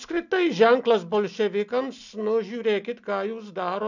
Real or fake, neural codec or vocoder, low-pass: real; none; 7.2 kHz